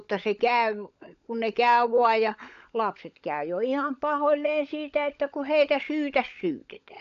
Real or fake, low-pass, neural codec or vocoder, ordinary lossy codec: fake; 7.2 kHz; codec, 16 kHz, 16 kbps, FunCodec, trained on LibriTTS, 50 frames a second; AAC, 96 kbps